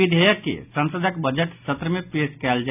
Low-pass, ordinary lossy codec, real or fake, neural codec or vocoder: 3.6 kHz; none; real; none